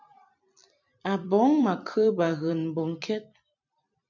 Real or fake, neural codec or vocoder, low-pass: fake; vocoder, 44.1 kHz, 128 mel bands every 256 samples, BigVGAN v2; 7.2 kHz